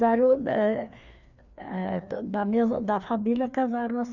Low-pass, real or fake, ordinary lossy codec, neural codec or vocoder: 7.2 kHz; fake; none; codec, 16 kHz, 2 kbps, FreqCodec, larger model